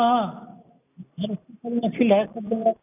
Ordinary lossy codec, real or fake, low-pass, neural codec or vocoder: none; real; 3.6 kHz; none